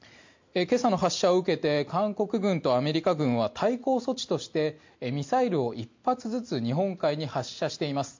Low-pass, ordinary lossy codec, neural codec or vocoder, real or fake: 7.2 kHz; MP3, 48 kbps; none; real